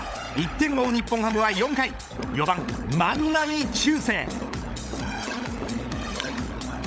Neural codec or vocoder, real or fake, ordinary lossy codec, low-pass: codec, 16 kHz, 16 kbps, FunCodec, trained on LibriTTS, 50 frames a second; fake; none; none